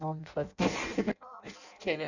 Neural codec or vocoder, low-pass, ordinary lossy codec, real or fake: codec, 16 kHz in and 24 kHz out, 0.6 kbps, FireRedTTS-2 codec; 7.2 kHz; none; fake